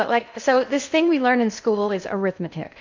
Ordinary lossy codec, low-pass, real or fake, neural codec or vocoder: MP3, 64 kbps; 7.2 kHz; fake; codec, 16 kHz in and 24 kHz out, 0.6 kbps, FocalCodec, streaming, 2048 codes